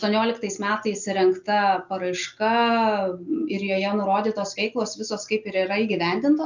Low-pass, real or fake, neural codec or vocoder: 7.2 kHz; real; none